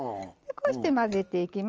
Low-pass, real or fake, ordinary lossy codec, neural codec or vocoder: 7.2 kHz; real; Opus, 24 kbps; none